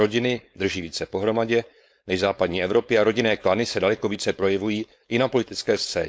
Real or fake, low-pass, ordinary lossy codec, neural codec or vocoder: fake; none; none; codec, 16 kHz, 4.8 kbps, FACodec